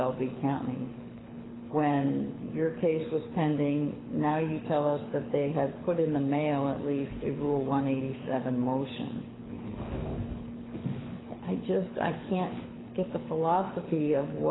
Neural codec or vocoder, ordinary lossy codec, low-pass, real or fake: codec, 24 kHz, 6 kbps, HILCodec; AAC, 16 kbps; 7.2 kHz; fake